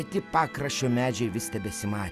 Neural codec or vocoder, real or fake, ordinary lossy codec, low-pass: none; real; Opus, 64 kbps; 14.4 kHz